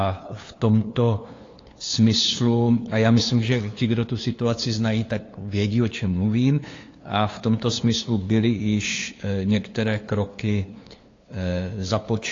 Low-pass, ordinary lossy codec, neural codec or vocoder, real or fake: 7.2 kHz; AAC, 32 kbps; codec, 16 kHz, 2 kbps, FunCodec, trained on LibriTTS, 25 frames a second; fake